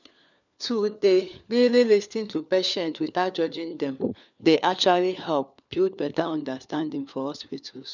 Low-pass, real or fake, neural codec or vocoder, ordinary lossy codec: 7.2 kHz; fake; codec, 16 kHz, 4 kbps, FunCodec, trained on LibriTTS, 50 frames a second; none